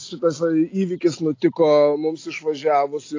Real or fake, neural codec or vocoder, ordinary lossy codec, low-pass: real; none; AAC, 32 kbps; 7.2 kHz